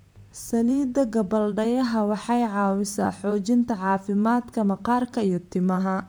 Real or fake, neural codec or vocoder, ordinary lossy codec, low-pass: fake; vocoder, 44.1 kHz, 128 mel bands, Pupu-Vocoder; none; none